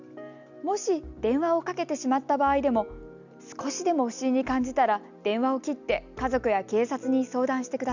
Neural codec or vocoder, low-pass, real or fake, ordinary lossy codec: none; 7.2 kHz; real; none